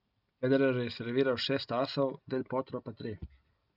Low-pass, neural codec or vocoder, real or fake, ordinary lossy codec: 5.4 kHz; none; real; none